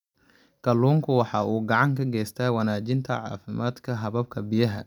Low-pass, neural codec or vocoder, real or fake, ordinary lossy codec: 19.8 kHz; none; real; none